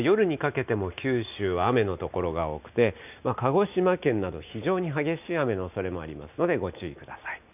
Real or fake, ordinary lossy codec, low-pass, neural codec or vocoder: real; none; 3.6 kHz; none